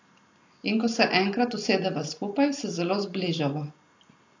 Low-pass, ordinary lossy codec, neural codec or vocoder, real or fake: 7.2 kHz; AAC, 48 kbps; none; real